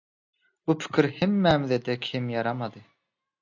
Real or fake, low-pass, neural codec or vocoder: real; 7.2 kHz; none